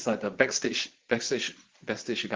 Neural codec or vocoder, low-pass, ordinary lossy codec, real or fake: vocoder, 22.05 kHz, 80 mel bands, Vocos; 7.2 kHz; Opus, 16 kbps; fake